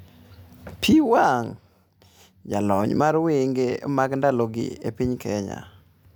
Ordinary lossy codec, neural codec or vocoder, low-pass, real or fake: none; none; none; real